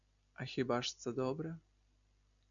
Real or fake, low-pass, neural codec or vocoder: real; 7.2 kHz; none